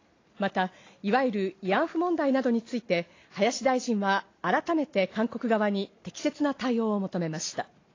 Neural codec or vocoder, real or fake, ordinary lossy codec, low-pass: none; real; AAC, 32 kbps; 7.2 kHz